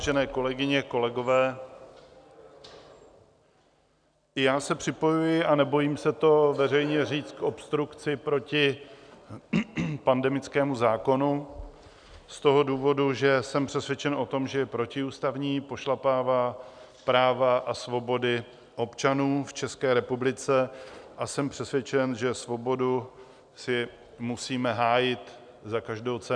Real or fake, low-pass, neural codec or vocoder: real; 9.9 kHz; none